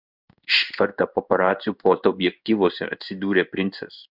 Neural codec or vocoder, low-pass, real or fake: codec, 16 kHz in and 24 kHz out, 1 kbps, XY-Tokenizer; 5.4 kHz; fake